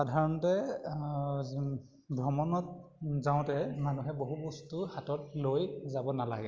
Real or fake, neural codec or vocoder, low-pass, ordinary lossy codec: real; none; 7.2 kHz; Opus, 32 kbps